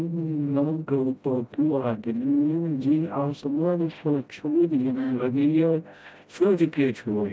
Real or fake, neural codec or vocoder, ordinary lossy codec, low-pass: fake; codec, 16 kHz, 0.5 kbps, FreqCodec, smaller model; none; none